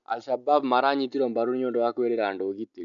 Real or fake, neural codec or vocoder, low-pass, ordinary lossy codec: real; none; 7.2 kHz; none